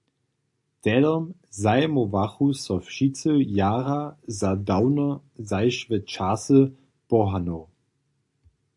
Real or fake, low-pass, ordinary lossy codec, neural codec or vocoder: real; 10.8 kHz; AAC, 64 kbps; none